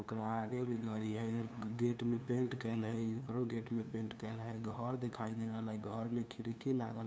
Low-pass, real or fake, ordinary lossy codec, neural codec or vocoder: none; fake; none; codec, 16 kHz, 2 kbps, FunCodec, trained on LibriTTS, 25 frames a second